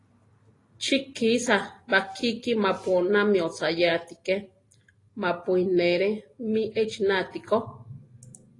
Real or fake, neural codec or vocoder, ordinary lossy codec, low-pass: real; none; AAC, 32 kbps; 10.8 kHz